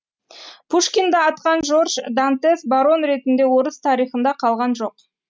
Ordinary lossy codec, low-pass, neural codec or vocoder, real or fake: none; none; none; real